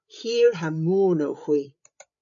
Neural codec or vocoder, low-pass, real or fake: codec, 16 kHz, 8 kbps, FreqCodec, larger model; 7.2 kHz; fake